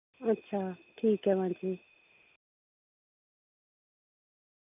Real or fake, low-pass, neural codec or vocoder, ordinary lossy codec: real; 3.6 kHz; none; none